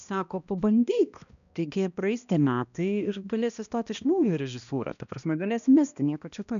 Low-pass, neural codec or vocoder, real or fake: 7.2 kHz; codec, 16 kHz, 1 kbps, X-Codec, HuBERT features, trained on balanced general audio; fake